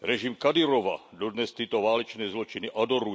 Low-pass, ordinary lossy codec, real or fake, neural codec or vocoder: none; none; real; none